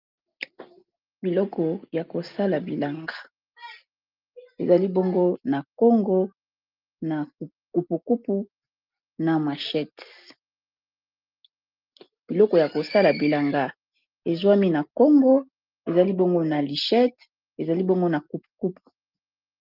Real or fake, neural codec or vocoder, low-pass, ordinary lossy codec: real; none; 5.4 kHz; Opus, 24 kbps